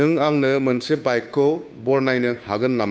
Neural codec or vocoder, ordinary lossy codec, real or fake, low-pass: codec, 16 kHz, 2 kbps, FunCodec, trained on Chinese and English, 25 frames a second; none; fake; none